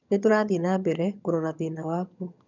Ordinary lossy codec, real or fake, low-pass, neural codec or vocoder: none; fake; 7.2 kHz; vocoder, 22.05 kHz, 80 mel bands, HiFi-GAN